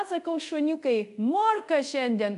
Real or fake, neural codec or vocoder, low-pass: fake; codec, 24 kHz, 0.5 kbps, DualCodec; 10.8 kHz